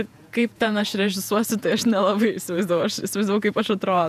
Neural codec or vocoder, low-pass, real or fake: vocoder, 48 kHz, 128 mel bands, Vocos; 14.4 kHz; fake